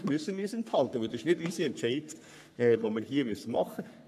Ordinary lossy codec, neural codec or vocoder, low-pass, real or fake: none; codec, 44.1 kHz, 3.4 kbps, Pupu-Codec; 14.4 kHz; fake